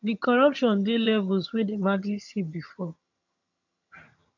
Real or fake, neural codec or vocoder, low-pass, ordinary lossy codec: fake; vocoder, 22.05 kHz, 80 mel bands, HiFi-GAN; 7.2 kHz; none